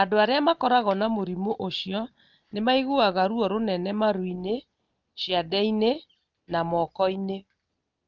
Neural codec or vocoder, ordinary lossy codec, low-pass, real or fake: none; Opus, 24 kbps; 7.2 kHz; real